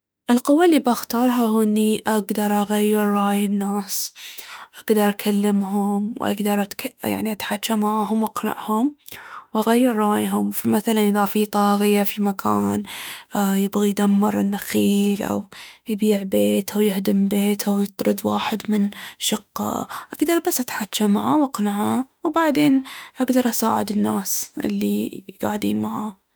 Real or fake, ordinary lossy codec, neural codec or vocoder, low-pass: fake; none; autoencoder, 48 kHz, 32 numbers a frame, DAC-VAE, trained on Japanese speech; none